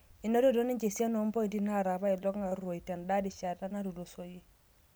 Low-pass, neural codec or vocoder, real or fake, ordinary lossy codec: none; none; real; none